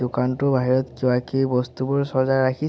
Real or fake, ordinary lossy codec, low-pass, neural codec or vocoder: real; none; none; none